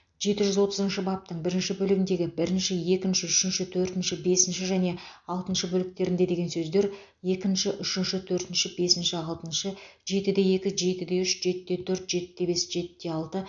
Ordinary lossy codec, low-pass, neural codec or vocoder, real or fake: none; 7.2 kHz; none; real